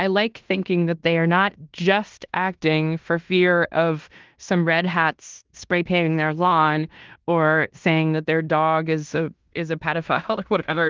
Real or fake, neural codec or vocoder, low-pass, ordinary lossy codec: fake; codec, 16 kHz in and 24 kHz out, 0.9 kbps, LongCat-Audio-Codec, fine tuned four codebook decoder; 7.2 kHz; Opus, 32 kbps